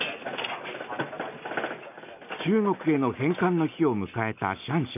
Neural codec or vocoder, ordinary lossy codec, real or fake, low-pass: none; none; real; 3.6 kHz